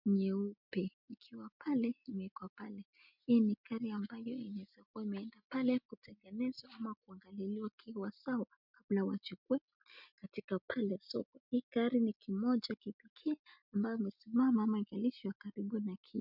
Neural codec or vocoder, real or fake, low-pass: none; real; 5.4 kHz